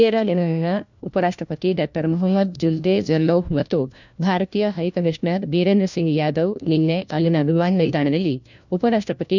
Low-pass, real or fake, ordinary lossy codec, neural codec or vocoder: 7.2 kHz; fake; none; codec, 16 kHz, 1 kbps, FunCodec, trained on LibriTTS, 50 frames a second